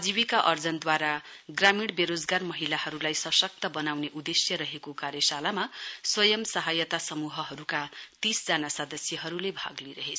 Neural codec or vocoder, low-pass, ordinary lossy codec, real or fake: none; none; none; real